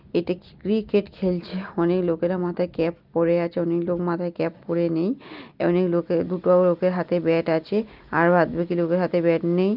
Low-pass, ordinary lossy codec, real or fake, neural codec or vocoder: 5.4 kHz; Opus, 32 kbps; real; none